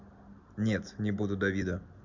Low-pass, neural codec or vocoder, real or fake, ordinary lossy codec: 7.2 kHz; none; real; none